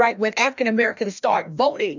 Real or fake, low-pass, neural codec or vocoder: fake; 7.2 kHz; codec, 16 kHz, 1 kbps, FreqCodec, larger model